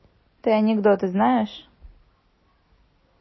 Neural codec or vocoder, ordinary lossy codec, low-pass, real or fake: none; MP3, 24 kbps; 7.2 kHz; real